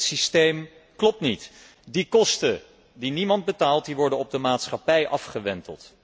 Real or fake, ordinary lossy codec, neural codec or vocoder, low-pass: real; none; none; none